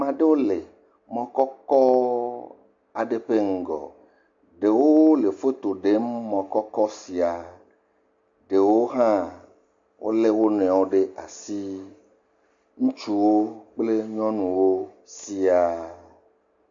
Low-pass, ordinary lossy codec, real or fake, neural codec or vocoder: 7.2 kHz; MP3, 48 kbps; real; none